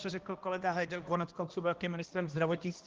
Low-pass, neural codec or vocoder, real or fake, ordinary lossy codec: 7.2 kHz; codec, 16 kHz, 1 kbps, X-Codec, HuBERT features, trained on balanced general audio; fake; Opus, 16 kbps